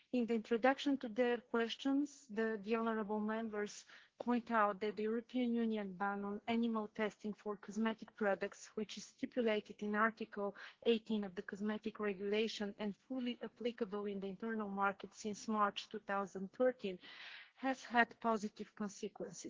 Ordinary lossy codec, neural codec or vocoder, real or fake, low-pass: Opus, 16 kbps; codec, 44.1 kHz, 2.6 kbps, SNAC; fake; 7.2 kHz